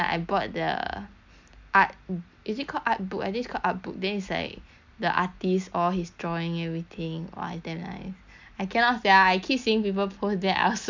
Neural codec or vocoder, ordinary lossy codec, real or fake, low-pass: none; MP3, 64 kbps; real; 7.2 kHz